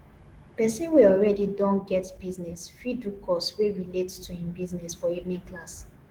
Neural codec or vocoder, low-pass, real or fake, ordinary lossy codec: autoencoder, 48 kHz, 128 numbers a frame, DAC-VAE, trained on Japanese speech; 19.8 kHz; fake; Opus, 16 kbps